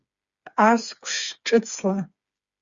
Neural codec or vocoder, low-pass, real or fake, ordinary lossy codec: codec, 16 kHz, 4 kbps, FreqCodec, smaller model; 7.2 kHz; fake; Opus, 64 kbps